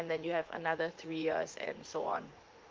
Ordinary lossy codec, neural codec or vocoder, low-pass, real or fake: Opus, 32 kbps; vocoder, 44.1 kHz, 80 mel bands, Vocos; 7.2 kHz; fake